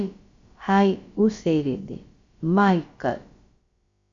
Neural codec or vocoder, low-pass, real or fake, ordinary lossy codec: codec, 16 kHz, about 1 kbps, DyCAST, with the encoder's durations; 7.2 kHz; fake; Opus, 64 kbps